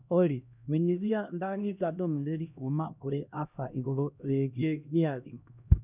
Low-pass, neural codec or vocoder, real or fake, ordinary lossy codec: 3.6 kHz; codec, 16 kHz, 1 kbps, X-Codec, HuBERT features, trained on LibriSpeech; fake; none